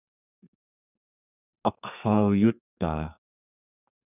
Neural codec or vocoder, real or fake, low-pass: codec, 32 kHz, 1.9 kbps, SNAC; fake; 3.6 kHz